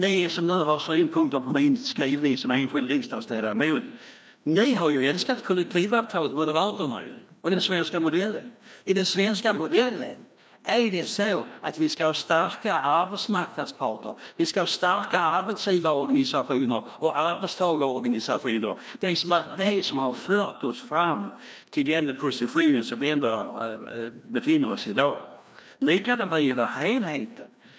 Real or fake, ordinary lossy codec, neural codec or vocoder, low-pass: fake; none; codec, 16 kHz, 1 kbps, FreqCodec, larger model; none